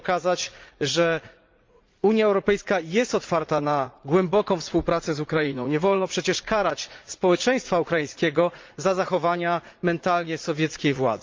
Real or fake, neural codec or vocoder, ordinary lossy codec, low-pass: fake; vocoder, 44.1 kHz, 80 mel bands, Vocos; Opus, 24 kbps; 7.2 kHz